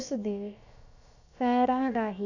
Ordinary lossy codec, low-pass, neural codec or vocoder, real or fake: none; 7.2 kHz; codec, 16 kHz, about 1 kbps, DyCAST, with the encoder's durations; fake